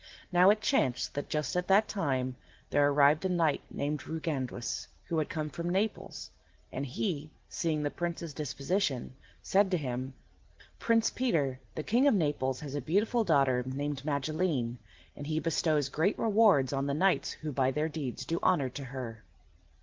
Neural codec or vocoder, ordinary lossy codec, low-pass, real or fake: none; Opus, 16 kbps; 7.2 kHz; real